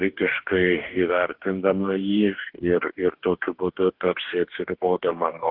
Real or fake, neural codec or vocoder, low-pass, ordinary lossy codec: fake; codec, 44.1 kHz, 2.6 kbps, DAC; 5.4 kHz; Opus, 16 kbps